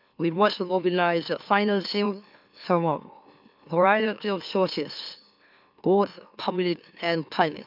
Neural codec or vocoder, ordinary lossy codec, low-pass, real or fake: autoencoder, 44.1 kHz, a latent of 192 numbers a frame, MeloTTS; none; 5.4 kHz; fake